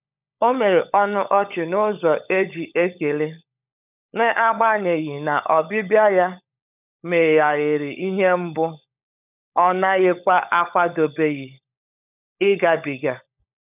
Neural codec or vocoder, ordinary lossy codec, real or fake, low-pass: codec, 16 kHz, 16 kbps, FunCodec, trained on LibriTTS, 50 frames a second; none; fake; 3.6 kHz